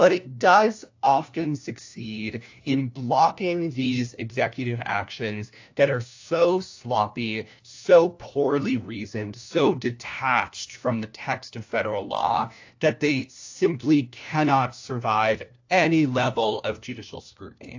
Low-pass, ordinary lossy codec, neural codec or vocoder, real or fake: 7.2 kHz; AAC, 48 kbps; codec, 16 kHz, 1 kbps, FunCodec, trained on LibriTTS, 50 frames a second; fake